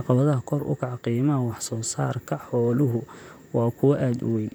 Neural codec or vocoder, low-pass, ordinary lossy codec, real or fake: vocoder, 44.1 kHz, 128 mel bands, Pupu-Vocoder; none; none; fake